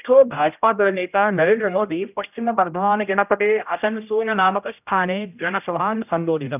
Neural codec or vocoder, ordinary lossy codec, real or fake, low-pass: codec, 16 kHz, 0.5 kbps, X-Codec, HuBERT features, trained on general audio; none; fake; 3.6 kHz